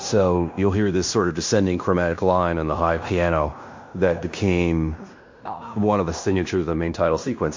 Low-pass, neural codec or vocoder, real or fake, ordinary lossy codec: 7.2 kHz; codec, 16 kHz in and 24 kHz out, 0.9 kbps, LongCat-Audio-Codec, fine tuned four codebook decoder; fake; MP3, 48 kbps